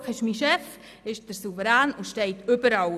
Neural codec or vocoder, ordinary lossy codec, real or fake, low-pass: none; none; real; 14.4 kHz